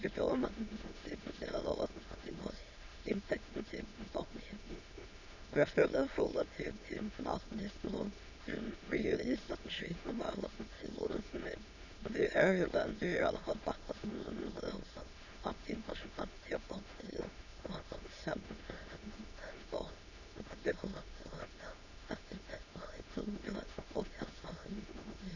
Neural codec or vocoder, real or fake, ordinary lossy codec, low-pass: autoencoder, 22.05 kHz, a latent of 192 numbers a frame, VITS, trained on many speakers; fake; none; 7.2 kHz